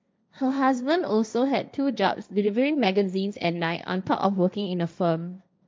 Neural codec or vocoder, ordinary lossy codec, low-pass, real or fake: codec, 16 kHz, 1.1 kbps, Voila-Tokenizer; none; none; fake